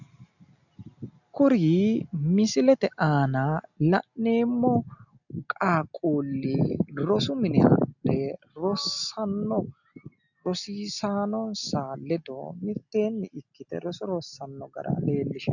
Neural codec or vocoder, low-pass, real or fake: none; 7.2 kHz; real